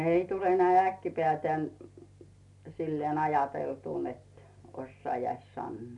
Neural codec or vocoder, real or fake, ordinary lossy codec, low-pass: none; real; none; 10.8 kHz